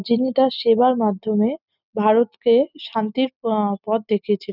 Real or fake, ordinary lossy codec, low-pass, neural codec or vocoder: real; none; 5.4 kHz; none